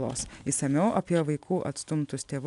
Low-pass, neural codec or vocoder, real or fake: 10.8 kHz; none; real